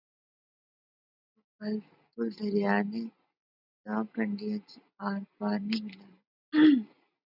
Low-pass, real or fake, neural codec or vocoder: 5.4 kHz; real; none